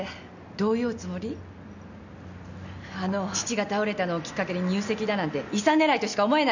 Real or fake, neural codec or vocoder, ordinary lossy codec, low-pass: real; none; none; 7.2 kHz